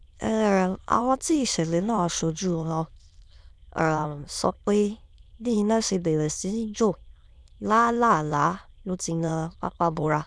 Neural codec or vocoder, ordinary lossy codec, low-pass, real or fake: autoencoder, 22.05 kHz, a latent of 192 numbers a frame, VITS, trained on many speakers; none; none; fake